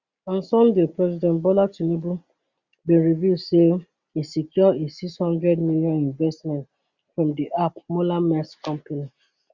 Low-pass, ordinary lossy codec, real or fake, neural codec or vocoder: 7.2 kHz; Opus, 64 kbps; real; none